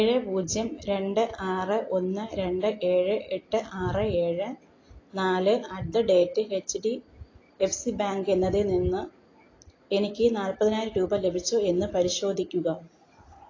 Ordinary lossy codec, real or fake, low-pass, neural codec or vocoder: AAC, 32 kbps; real; 7.2 kHz; none